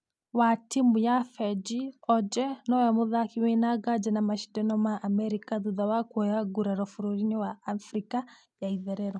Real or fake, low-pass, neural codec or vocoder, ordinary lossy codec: real; 9.9 kHz; none; none